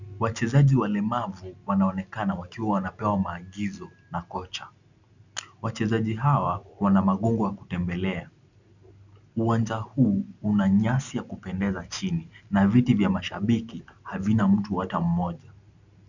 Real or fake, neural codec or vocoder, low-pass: real; none; 7.2 kHz